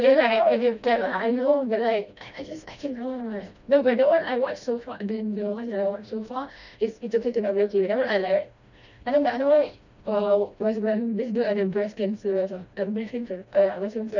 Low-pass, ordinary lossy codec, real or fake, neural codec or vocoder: 7.2 kHz; none; fake; codec, 16 kHz, 1 kbps, FreqCodec, smaller model